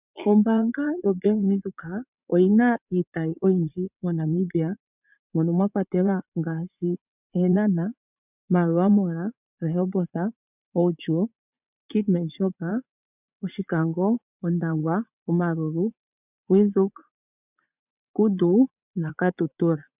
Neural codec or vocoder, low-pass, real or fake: vocoder, 44.1 kHz, 80 mel bands, Vocos; 3.6 kHz; fake